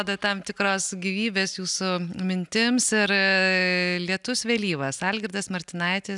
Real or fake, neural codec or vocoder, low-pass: real; none; 10.8 kHz